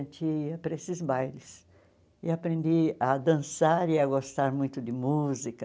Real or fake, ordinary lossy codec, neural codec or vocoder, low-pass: real; none; none; none